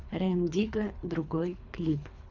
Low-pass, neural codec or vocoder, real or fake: 7.2 kHz; codec, 24 kHz, 3 kbps, HILCodec; fake